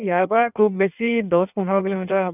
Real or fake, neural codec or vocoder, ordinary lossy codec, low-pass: fake; codec, 16 kHz in and 24 kHz out, 1.1 kbps, FireRedTTS-2 codec; none; 3.6 kHz